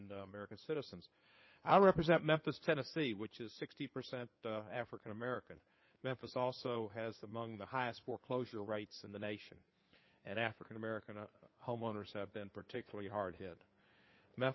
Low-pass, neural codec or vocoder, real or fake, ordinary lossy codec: 7.2 kHz; codec, 16 kHz in and 24 kHz out, 2.2 kbps, FireRedTTS-2 codec; fake; MP3, 24 kbps